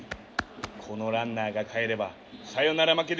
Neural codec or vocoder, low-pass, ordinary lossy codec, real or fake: none; none; none; real